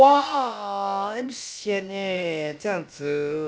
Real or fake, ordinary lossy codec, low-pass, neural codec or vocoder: fake; none; none; codec, 16 kHz, about 1 kbps, DyCAST, with the encoder's durations